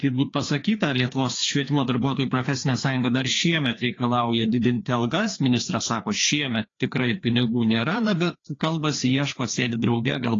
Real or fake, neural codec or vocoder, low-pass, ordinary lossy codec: fake; codec, 16 kHz, 2 kbps, FreqCodec, larger model; 7.2 kHz; AAC, 32 kbps